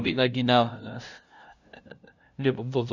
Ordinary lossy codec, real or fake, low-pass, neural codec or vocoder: none; fake; 7.2 kHz; codec, 16 kHz, 0.5 kbps, FunCodec, trained on LibriTTS, 25 frames a second